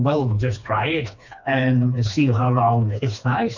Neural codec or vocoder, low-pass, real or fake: codec, 16 kHz, 2 kbps, FreqCodec, smaller model; 7.2 kHz; fake